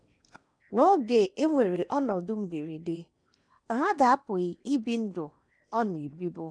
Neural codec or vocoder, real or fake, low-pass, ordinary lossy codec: codec, 16 kHz in and 24 kHz out, 0.8 kbps, FocalCodec, streaming, 65536 codes; fake; 9.9 kHz; none